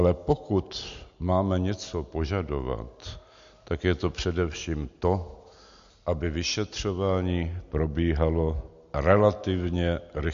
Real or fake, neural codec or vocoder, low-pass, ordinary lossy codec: real; none; 7.2 kHz; MP3, 48 kbps